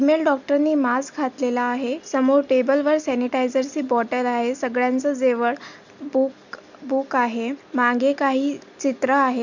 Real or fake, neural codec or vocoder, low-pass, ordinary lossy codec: real; none; 7.2 kHz; none